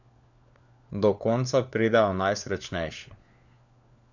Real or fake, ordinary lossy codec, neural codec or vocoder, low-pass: fake; none; codec, 16 kHz, 4 kbps, FunCodec, trained on LibriTTS, 50 frames a second; 7.2 kHz